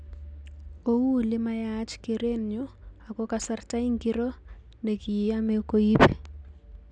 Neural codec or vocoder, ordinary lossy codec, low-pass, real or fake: none; none; 9.9 kHz; real